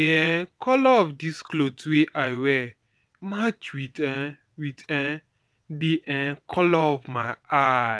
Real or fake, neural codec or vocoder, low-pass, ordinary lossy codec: fake; vocoder, 22.05 kHz, 80 mel bands, WaveNeXt; none; none